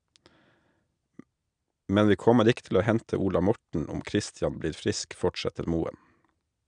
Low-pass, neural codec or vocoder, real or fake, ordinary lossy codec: 9.9 kHz; none; real; none